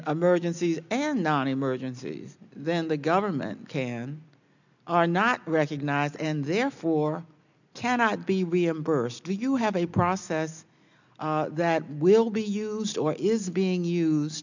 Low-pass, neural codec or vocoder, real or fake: 7.2 kHz; none; real